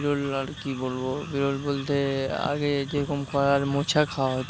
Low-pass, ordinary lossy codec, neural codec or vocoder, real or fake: none; none; none; real